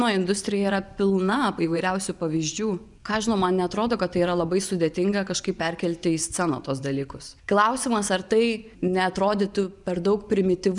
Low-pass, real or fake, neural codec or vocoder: 10.8 kHz; fake; vocoder, 24 kHz, 100 mel bands, Vocos